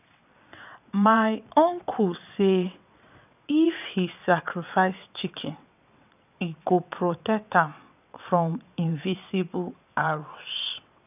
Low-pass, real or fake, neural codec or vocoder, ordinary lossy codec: 3.6 kHz; fake; vocoder, 22.05 kHz, 80 mel bands, WaveNeXt; none